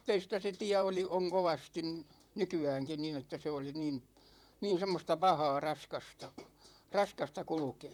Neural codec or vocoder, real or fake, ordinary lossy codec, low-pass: vocoder, 44.1 kHz, 128 mel bands, Pupu-Vocoder; fake; none; 19.8 kHz